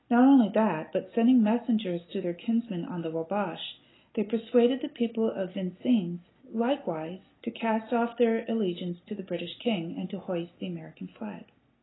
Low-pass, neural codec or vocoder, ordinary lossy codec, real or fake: 7.2 kHz; none; AAC, 16 kbps; real